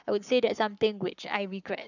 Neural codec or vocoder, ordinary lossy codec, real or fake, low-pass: codec, 44.1 kHz, 7.8 kbps, Pupu-Codec; none; fake; 7.2 kHz